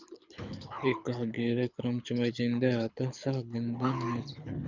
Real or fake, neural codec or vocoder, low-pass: fake; codec, 24 kHz, 6 kbps, HILCodec; 7.2 kHz